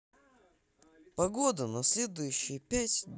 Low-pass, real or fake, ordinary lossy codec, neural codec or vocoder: none; real; none; none